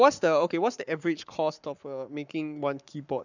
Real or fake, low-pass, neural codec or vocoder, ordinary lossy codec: fake; 7.2 kHz; codec, 44.1 kHz, 7.8 kbps, Pupu-Codec; none